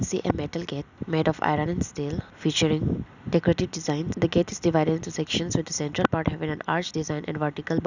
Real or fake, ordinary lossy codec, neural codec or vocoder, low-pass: real; none; none; 7.2 kHz